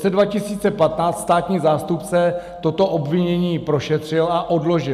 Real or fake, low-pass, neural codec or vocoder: real; 14.4 kHz; none